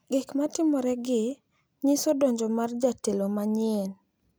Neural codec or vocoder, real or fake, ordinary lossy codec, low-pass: none; real; none; none